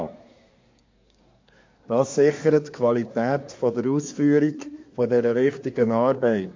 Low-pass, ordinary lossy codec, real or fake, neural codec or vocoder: 7.2 kHz; MP3, 48 kbps; fake; codec, 24 kHz, 1 kbps, SNAC